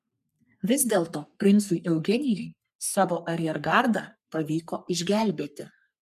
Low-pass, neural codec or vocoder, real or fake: 14.4 kHz; codec, 44.1 kHz, 3.4 kbps, Pupu-Codec; fake